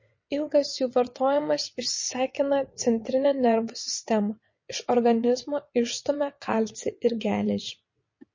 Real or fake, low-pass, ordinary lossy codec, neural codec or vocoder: fake; 7.2 kHz; MP3, 32 kbps; vocoder, 22.05 kHz, 80 mel bands, WaveNeXt